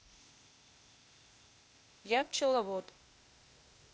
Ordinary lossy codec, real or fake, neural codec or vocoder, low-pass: none; fake; codec, 16 kHz, 0.8 kbps, ZipCodec; none